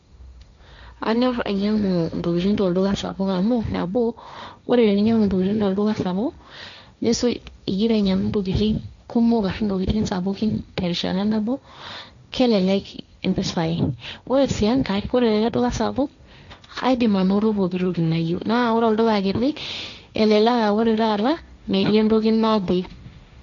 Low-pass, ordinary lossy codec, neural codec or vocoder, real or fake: 7.2 kHz; none; codec, 16 kHz, 1.1 kbps, Voila-Tokenizer; fake